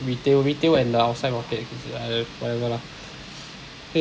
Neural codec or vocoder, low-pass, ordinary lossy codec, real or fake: none; none; none; real